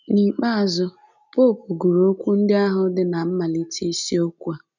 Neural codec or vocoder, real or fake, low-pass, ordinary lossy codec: none; real; 7.2 kHz; none